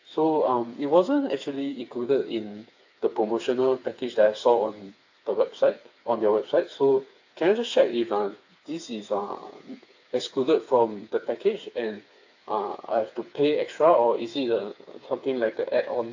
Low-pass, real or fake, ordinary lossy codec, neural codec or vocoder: 7.2 kHz; fake; AAC, 48 kbps; codec, 16 kHz, 4 kbps, FreqCodec, smaller model